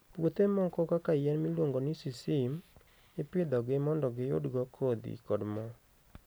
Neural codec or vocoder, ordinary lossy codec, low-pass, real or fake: none; none; none; real